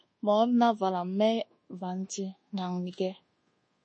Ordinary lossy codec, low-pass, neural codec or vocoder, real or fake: MP3, 32 kbps; 9.9 kHz; codec, 24 kHz, 1.2 kbps, DualCodec; fake